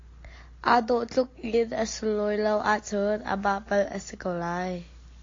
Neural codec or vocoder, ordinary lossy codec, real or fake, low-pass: none; AAC, 32 kbps; real; 7.2 kHz